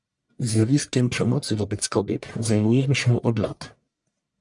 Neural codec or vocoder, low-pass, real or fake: codec, 44.1 kHz, 1.7 kbps, Pupu-Codec; 10.8 kHz; fake